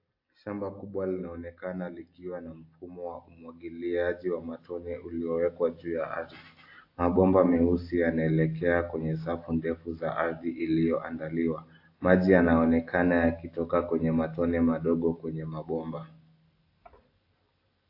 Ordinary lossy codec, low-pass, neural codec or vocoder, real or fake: AAC, 32 kbps; 5.4 kHz; none; real